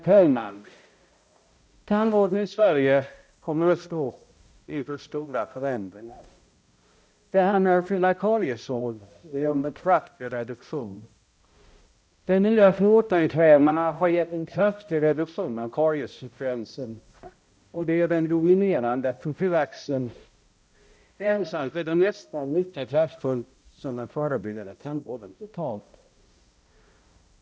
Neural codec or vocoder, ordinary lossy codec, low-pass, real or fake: codec, 16 kHz, 0.5 kbps, X-Codec, HuBERT features, trained on balanced general audio; none; none; fake